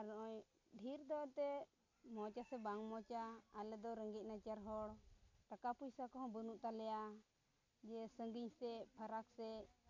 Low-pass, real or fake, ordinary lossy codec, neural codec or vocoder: 7.2 kHz; real; MP3, 48 kbps; none